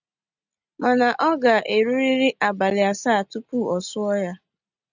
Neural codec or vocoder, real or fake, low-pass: none; real; 7.2 kHz